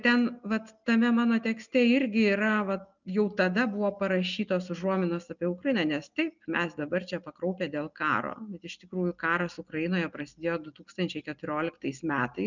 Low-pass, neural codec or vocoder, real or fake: 7.2 kHz; none; real